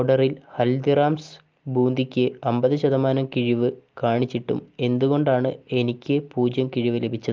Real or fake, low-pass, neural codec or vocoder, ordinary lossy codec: real; 7.2 kHz; none; Opus, 32 kbps